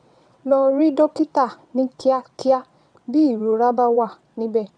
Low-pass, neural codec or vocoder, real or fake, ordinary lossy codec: 9.9 kHz; vocoder, 22.05 kHz, 80 mel bands, WaveNeXt; fake; none